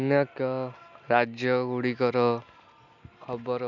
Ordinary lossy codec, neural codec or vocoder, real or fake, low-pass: none; none; real; 7.2 kHz